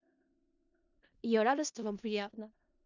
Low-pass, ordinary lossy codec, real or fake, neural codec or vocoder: 7.2 kHz; none; fake; codec, 16 kHz in and 24 kHz out, 0.4 kbps, LongCat-Audio-Codec, four codebook decoder